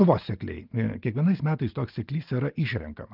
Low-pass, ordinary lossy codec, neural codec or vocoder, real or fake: 5.4 kHz; Opus, 24 kbps; none; real